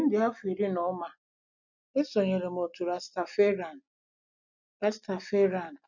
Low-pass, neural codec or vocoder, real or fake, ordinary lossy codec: 7.2 kHz; none; real; none